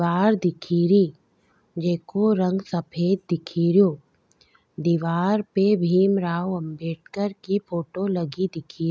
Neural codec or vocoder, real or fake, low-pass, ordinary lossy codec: none; real; none; none